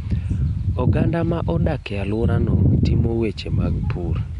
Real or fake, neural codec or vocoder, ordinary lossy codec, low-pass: real; none; none; 10.8 kHz